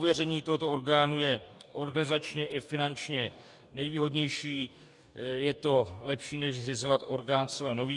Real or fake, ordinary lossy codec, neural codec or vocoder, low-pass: fake; MP3, 96 kbps; codec, 44.1 kHz, 2.6 kbps, DAC; 10.8 kHz